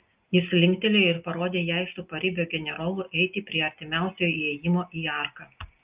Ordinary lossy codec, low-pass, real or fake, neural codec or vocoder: Opus, 24 kbps; 3.6 kHz; real; none